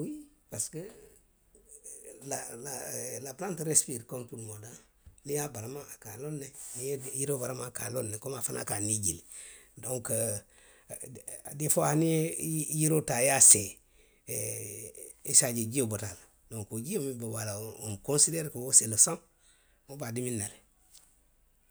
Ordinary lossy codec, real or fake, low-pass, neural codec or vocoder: none; real; none; none